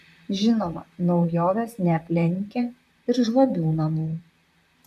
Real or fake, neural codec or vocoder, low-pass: fake; vocoder, 44.1 kHz, 128 mel bands, Pupu-Vocoder; 14.4 kHz